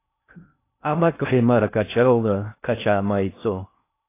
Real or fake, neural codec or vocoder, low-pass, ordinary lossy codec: fake; codec, 16 kHz in and 24 kHz out, 0.6 kbps, FocalCodec, streaming, 2048 codes; 3.6 kHz; AAC, 24 kbps